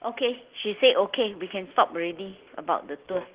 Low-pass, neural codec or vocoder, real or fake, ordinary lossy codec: 3.6 kHz; none; real; Opus, 16 kbps